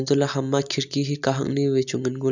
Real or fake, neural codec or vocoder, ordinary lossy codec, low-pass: real; none; none; 7.2 kHz